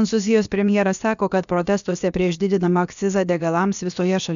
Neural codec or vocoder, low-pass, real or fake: codec, 16 kHz, about 1 kbps, DyCAST, with the encoder's durations; 7.2 kHz; fake